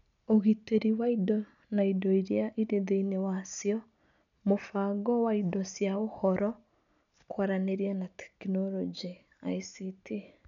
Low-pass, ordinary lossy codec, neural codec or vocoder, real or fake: 7.2 kHz; none; none; real